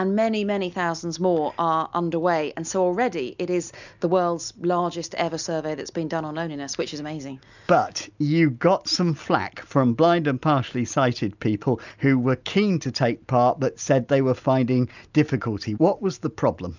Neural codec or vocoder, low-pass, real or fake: none; 7.2 kHz; real